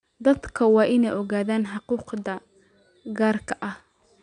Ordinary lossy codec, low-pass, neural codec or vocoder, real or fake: none; 9.9 kHz; none; real